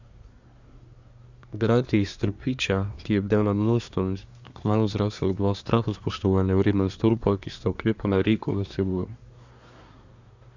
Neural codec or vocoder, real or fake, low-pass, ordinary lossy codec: codec, 24 kHz, 1 kbps, SNAC; fake; 7.2 kHz; Opus, 64 kbps